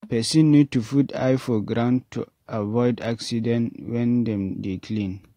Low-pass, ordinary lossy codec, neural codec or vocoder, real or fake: 19.8 kHz; AAC, 48 kbps; none; real